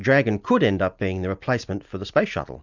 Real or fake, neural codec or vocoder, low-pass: real; none; 7.2 kHz